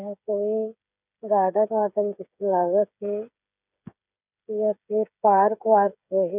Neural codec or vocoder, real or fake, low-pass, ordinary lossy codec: codec, 16 kHz, 8 kbps, FreqCodec, smaller model; fake; 3.6 kHz; none